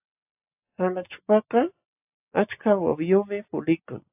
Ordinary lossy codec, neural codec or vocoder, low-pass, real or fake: AAC, 32 kbps; none; 3.6 kHz; real